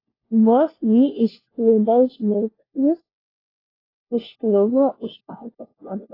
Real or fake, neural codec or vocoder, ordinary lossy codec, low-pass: fake; codec, 16 kHz, 1 kbps, FunCodec, trained on LibriTTS, 50 frames a second; AAC, 24 kbps; 5.4 kHz